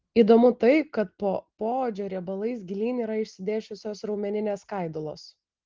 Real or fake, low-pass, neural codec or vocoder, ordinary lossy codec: real; 7.2 kHz; none; Opus, 16 kbps